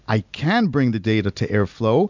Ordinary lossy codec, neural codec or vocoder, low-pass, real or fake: MP3, 64 kbps; none; 7.2 kHz; real